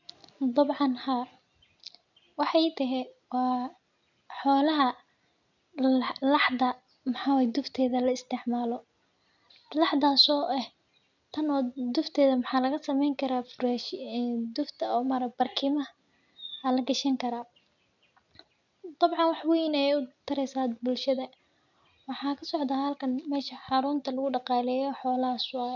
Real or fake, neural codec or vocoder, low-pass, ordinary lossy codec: real; none; 7.2 kHz; none